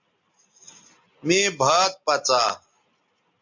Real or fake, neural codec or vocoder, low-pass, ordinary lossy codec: real; none; 7.2 kHz; MP3, 48 kbps